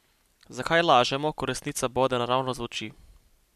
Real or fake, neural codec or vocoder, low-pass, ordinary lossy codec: real; none; 14.4 kHz; none